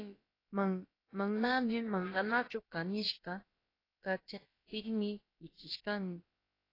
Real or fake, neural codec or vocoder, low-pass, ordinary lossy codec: fake; codec, 16 kHz, about 1 kbps, DyCAST, with the encoder's durations; 5.4 kHz; AAC, 24 kbps